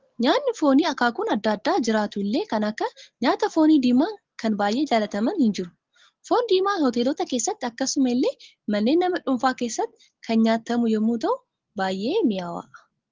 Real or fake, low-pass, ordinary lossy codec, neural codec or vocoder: real; 7.2 kHz; Opus, 16 kbps; none